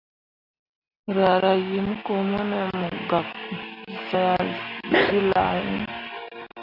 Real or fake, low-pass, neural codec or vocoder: real; 5.4 kHz; none